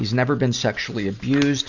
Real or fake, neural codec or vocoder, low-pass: real; none; 7.2 kHz